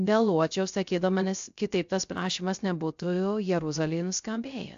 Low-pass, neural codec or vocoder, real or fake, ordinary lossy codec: 7.2 kHz; codec, 16 kHz, 0.3 kbps, FocalCodec; fake; AAC, 64 kbps